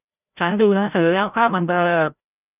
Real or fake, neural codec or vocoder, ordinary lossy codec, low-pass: fake; codec, 16 kHz, 0.5 kbps, FreqCodec, larger model; none; 3.6 kHz